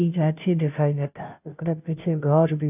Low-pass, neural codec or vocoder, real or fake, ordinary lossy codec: 3.6 kHz; codec, 16 kHz, 0.5 kbps, FunCodec, trained on Chinese and English, 25 frames a second; fake; none